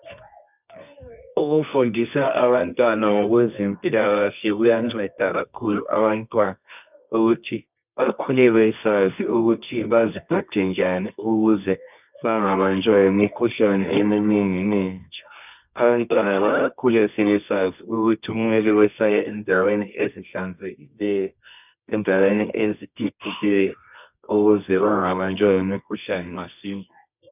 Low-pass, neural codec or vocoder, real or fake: 3.6 kHz; codec, 24 kHz, 0.9 kbps, WavTokenizer, medium music audio release; fake